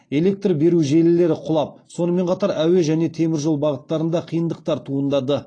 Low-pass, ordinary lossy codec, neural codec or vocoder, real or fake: 9.9 kHz; AAC, 32 kbps; none; real